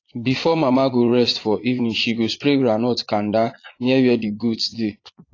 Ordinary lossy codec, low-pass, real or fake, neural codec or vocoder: AAC, 32 kbps; 7.2 kHz; fake; vocoder, 22.05 kHz, 80 mel bands, Vocos